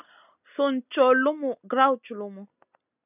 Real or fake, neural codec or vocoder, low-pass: real; none; 3.6 kHz